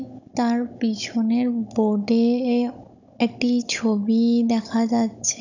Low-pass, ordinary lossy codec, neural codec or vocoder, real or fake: 7.2 kHz; none; codec, 16 kHz, 16 kbps, FunCodec, trained on Chinese and English, 50 frames a second; fake